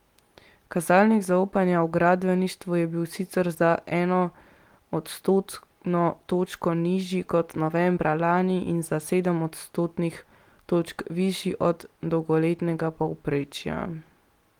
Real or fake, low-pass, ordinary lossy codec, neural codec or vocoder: real; 19.8 kHz; Opus, 24 kbps; none